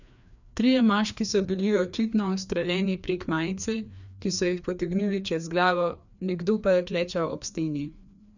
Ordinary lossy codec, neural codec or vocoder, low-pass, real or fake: none; codec, 16 kHz, 2 kbps, FreqCodec, larger model; 7.2 kHz; fake